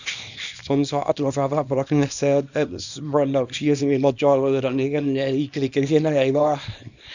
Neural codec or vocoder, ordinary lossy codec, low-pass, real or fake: codec, 24 kHz, 0.9 kbps, WavTokenizer, small release; none; 7.2 kHz; fake